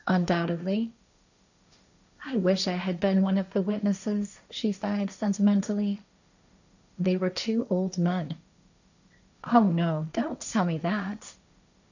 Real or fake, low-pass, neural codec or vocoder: fake; 7.2 kHz; codec, 16 kHz, 1.1 kbps, Voila-Tokenizer